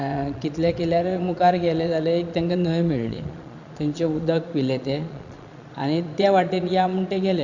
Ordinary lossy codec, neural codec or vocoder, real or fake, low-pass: Opus, 64 kbps; vocoder, 22.05 kHz, 80 mel bands, Vocos; fake; 7.2 kHz